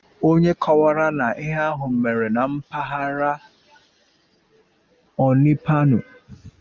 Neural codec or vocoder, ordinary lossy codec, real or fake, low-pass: none; Opus, 24 kbps; real; 7.2 kHz